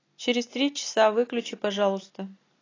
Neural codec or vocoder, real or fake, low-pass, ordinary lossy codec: none; real; 7.2 kHz; AAC, 32 kbps